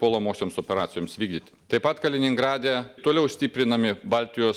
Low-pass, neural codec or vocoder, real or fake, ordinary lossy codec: 19.8 kHz; none; real; Opus, 24 kbps